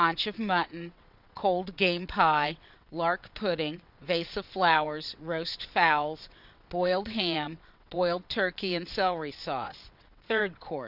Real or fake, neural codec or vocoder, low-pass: fake; vocoder, 22.05 kHz, 80 mel bands, WaveNeXt; 5.4 kHz